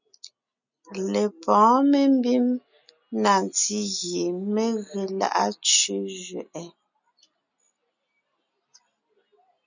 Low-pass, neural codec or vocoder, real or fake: 7.2 kHz; none; real